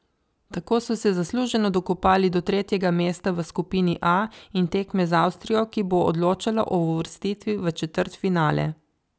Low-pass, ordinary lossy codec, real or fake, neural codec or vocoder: none; none; real; none